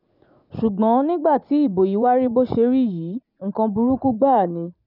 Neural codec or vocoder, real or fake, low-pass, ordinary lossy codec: vocoder, 44.1 kHz, 80 mel bands, Vocos; fake; 5.4 kHz; none